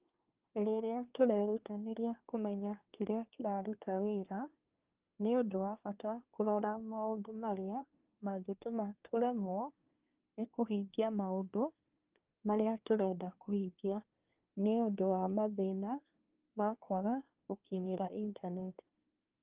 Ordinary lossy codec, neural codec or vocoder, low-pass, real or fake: Opus, 32 kbps; codec, 24 kHz, 1 kbps, SNAC; 3.6 kHz; fake